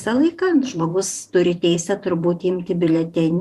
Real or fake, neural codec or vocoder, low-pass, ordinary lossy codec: fake; vocoder, 44.1 kHz, 128 mel bands every 512 samples, BigVGAN v2; 14.4 kHz; Opus, 64 kbps